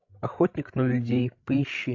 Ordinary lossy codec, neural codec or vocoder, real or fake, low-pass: AAC, 48 kbps; codec, 16 kHz, 16 kbps, FreqCodec, larger model; fake; 7.2 kHz